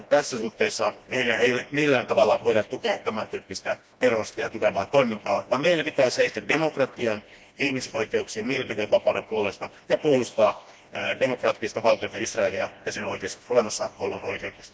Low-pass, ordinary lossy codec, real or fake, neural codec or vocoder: none; none; fake; codec, 16 kHz, 1 kbps, FreqCodec, smaller model